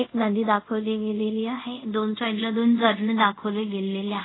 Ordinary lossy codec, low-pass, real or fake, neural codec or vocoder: AAC, 16 kbps; 7.2 kHz; fake; codec, 24 kHz, 0.5 kbps, DualCodec